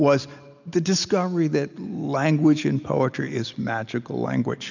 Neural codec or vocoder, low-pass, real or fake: none; 7.2 kHz; real